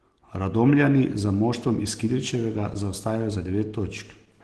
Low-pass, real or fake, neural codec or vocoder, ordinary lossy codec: 10.8 kHz; real; none; Opus, 16 kbps